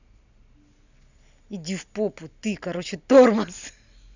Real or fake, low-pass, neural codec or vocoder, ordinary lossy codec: real; 7.2 kHz; none; none